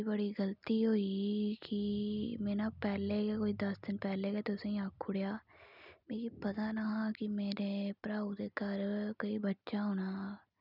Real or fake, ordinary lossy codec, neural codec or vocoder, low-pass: real; none; none; 5.4 kHz